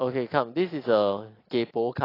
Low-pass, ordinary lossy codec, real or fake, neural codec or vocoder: 5.4 kHz; AAC, 24 kbps; real; none